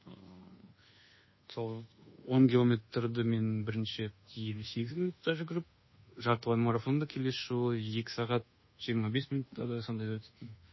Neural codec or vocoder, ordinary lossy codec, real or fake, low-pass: codec, 24 kHz, 1.2 kbps, DualCodec; MP3, 24 kbps; fake; 7.2 kHz